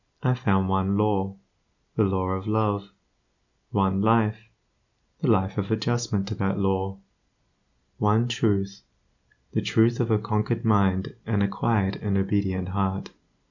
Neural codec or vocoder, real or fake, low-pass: none; real; 7.2 kHz